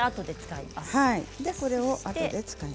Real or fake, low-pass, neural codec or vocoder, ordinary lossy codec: real; none; none; none